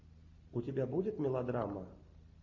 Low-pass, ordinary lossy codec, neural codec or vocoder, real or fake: 7.2 kHz; MP3, 48 kbps; none; real